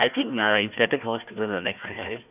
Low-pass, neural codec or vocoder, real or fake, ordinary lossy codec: 3.6 kHz; codec, 16 kHz, 1 kbps, FunCodec, trained on Chinese and English, 50 frames a second; fake; none